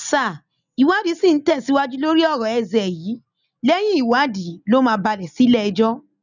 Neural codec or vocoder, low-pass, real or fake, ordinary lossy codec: none; 7.2 kHz; real; none